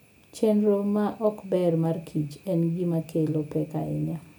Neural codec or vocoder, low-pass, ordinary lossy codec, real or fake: none; none; none; real